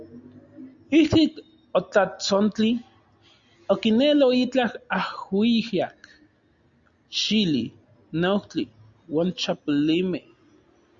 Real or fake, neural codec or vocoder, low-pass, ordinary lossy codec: real; none; 7.2 kHz; Opus, 64 kbps